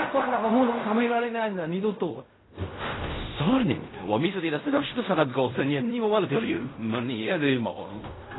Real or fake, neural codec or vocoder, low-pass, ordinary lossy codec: fake; codec, 16 kHz in and 24 kHz out, 0.4 kbps, LongCat-Audio-Codec, fine tuned four codebook decoder; 7.2 kHz; AAC, 16 kbps